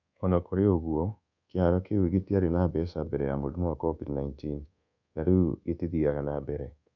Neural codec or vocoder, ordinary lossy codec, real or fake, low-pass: codec, 24 kHz, 1.2 kbps, DualCodec; none; fake; 7.2 kHz